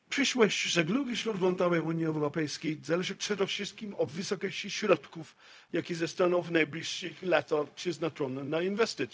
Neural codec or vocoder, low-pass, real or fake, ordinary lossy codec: codec, 16 kHz, 0.4 kbps, LongCat-Audio-Codec; none; fake; none